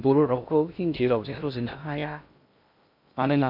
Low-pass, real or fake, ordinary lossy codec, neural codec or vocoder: 5.4 kHz; fake; MP3, 48 kbps; codec, 16 kHz in and 24 kHz out, 0.6 kbps, FocalCodec, streaming, 2048 codes